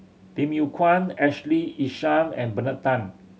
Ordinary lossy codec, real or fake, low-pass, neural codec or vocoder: none; real; none; none